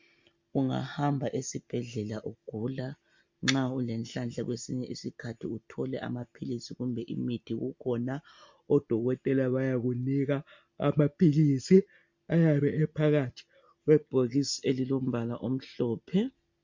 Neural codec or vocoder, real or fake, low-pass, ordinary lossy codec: none; real; 7.2 kHz; MP3, 48 kbps